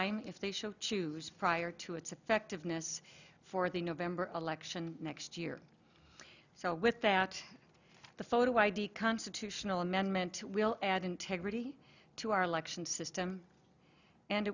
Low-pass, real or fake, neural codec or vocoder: 7.2 kHz; real; none